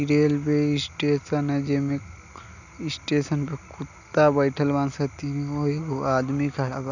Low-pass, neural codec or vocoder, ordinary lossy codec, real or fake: 7.2 kHz; none; none; real